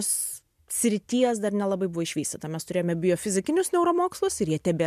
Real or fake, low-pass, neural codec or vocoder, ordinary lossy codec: real; 14.4 kHz; none; MP3, 96 kbps